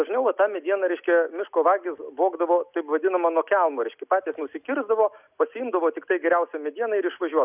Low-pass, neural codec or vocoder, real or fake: 3.6 kHz; none; real